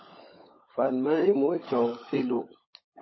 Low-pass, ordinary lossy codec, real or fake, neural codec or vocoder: 7.2 kHz; MP3, 24 kbps; fake; codec, 16 kHz, 16 kbps, FunCodec, trained on LibriTTS, 50 frames a second